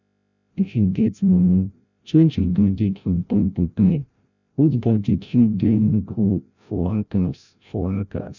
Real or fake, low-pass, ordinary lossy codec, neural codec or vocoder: fake; 7.2 kHz; none; codec, 16 kHz, 0.5 kbps, FreqCodec, larger model